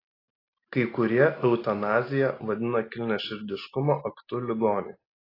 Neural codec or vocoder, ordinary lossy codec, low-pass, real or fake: none; AAC, 24 kbps; 5.4 kHz; real